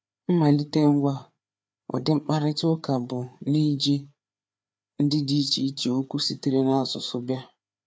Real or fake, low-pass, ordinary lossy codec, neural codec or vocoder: fake; none; none; codec, 16 kHz, 4 kbps, FreqCodec, larger model